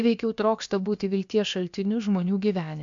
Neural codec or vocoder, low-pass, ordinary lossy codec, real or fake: codec, 16 kHz, about 1 kbps, DyCAST, with the encoder's durations; 7.2 kHz; AAC, 64 kbps; fake